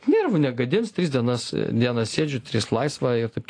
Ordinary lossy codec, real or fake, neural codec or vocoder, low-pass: AAC, 48 kbps; real; none; 9.9 kHz